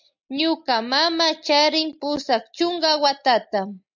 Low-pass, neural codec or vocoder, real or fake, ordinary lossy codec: 7.2 kHz; none; real; MP3, 64 kbps